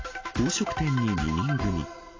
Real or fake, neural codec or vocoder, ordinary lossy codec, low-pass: real; none; AAC, 32 kbps; 7.2 kHz